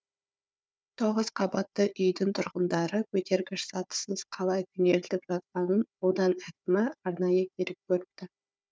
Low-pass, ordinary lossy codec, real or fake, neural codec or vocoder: none; none; fake; codec, 16 kHz, 4 kbps, FunCodec, trained on Chinese and English, 50 frames a second